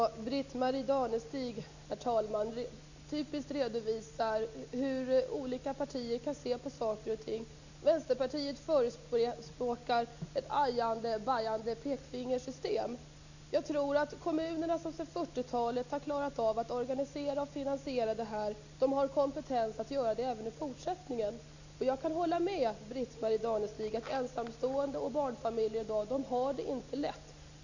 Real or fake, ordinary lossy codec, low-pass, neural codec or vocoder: real; none; 7.2 kHz; none